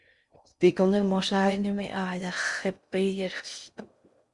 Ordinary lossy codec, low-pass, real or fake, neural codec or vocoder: Opus, 64 kbps; 10.8 kHz; fake; codec, 16 kHz in and 24 kHz out, 0.6 kbps, FocalCodec, streaming, 4096 codes